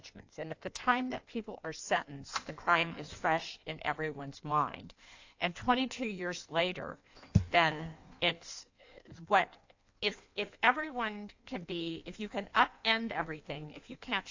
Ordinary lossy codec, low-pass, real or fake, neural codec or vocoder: AAC, 48 kbps; 7.2 kHz; fake; codec, 16 kHz in and 24 kHz out, 1.1 kbps, FireRedTTS-2 codec